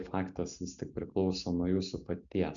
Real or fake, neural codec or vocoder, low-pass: real; none; 7.2 kHz